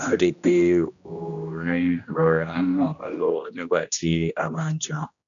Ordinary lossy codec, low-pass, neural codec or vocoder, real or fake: none; 7.2 kHz; codec, 16 kHz, 1 kbps, X-Codec, HuBERT features, trained on general audio; fake